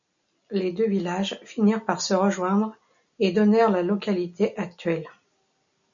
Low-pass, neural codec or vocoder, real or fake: 7.2 kHz; none; real